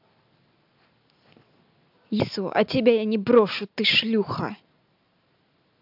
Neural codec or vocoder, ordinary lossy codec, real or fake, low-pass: none; AAC, 48 kbps; real; 5.4 kHz